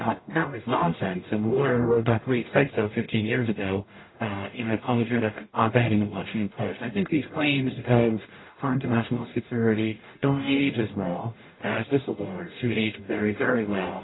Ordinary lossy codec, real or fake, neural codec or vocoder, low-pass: AAC, 16 kbps; fake; codec, 44.1 kHz, 0.9 kbps, DAC; 7.2 kHz